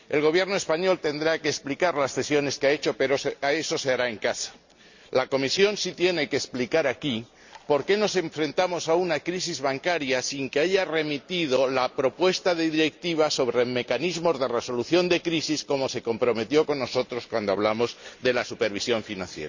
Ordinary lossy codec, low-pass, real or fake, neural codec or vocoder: Opus, 64 kbps; 7.2 kHz; real; none